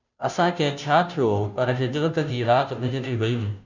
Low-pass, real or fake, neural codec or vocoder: 7.2 kHz; fake; codec, 16 kHz, 0.5 kbps, FunCodec, trained on Chinese and English, 25 frames a second